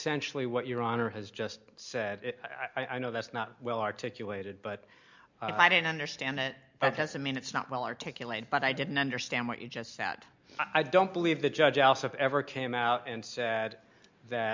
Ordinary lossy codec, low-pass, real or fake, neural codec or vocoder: MP3, 64 kbps; 7.2 kHz; real; none